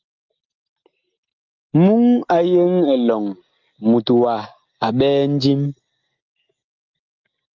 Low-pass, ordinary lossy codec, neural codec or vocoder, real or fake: 7.2 kHz; Opus, 32 kbps; none; real